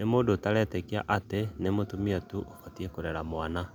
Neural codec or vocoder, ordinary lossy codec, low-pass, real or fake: none; none; none; real